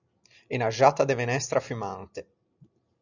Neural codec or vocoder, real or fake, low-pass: none; real; 7.2 kHz